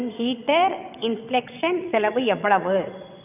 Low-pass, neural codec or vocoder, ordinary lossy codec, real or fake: 3.6 kHz; vocoder, 22.05 kHz, 80 mel bands, WaveNeXt; none; fake